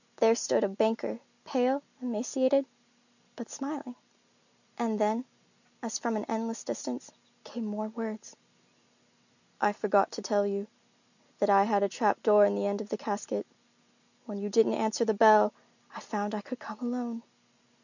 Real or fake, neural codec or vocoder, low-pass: real; none; 7.2 kHz